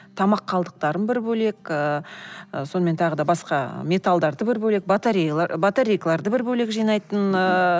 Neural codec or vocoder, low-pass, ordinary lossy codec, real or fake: none; none; none; real